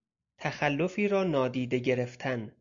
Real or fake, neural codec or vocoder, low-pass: real; none; 7.2 kHz